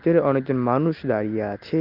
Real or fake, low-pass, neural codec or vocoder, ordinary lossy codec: real; 5.4 kHz; none; Opus, 32 kbps